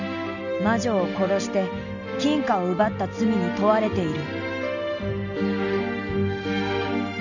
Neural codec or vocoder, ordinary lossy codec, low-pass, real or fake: none; none; 7.2 kHz; real